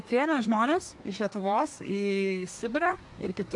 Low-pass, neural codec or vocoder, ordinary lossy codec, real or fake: 10.8 kHz; codec, 24 kHz, 1 kbps, SNAC; AAC, 64 kbps; fake